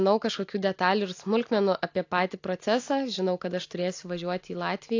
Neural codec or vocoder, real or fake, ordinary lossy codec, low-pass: none; real; AAC, 48 kbps; 7.2 kHz